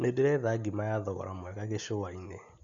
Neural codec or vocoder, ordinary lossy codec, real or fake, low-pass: none; none; real; 7.2 kHz